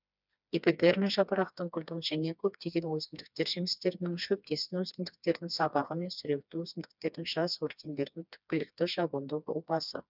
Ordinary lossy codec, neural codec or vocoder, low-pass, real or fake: none; codec, 16 kHz, 2 kbps, FreqCodec, smaller model; 5.4 kHz; fake